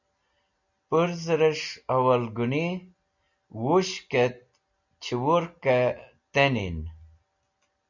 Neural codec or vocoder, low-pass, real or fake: none; 7.2 kHz; real